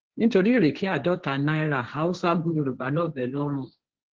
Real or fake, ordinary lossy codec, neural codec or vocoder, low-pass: fake; Opus, 16 kbps; codec, 16 kHz, 1.1 kbps, Voila-Tokenizer; 7.2 kHz